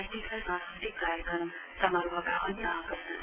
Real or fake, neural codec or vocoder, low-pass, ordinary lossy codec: fake; vocoder, 22.05 kHz, 80 mel bands, WaveNeXt; 3.6 kHz; AAC, 32 kbps